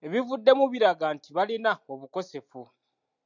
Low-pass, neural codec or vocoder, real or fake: 7.2 kHz; none; real